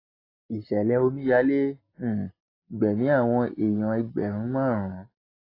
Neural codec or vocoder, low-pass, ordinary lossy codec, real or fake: none; 5.4 kHz; AAC, 24 kbps; real